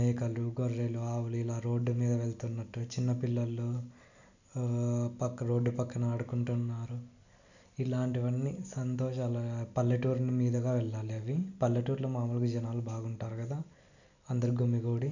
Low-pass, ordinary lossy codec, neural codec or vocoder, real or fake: 7.2 kHz; none; none; real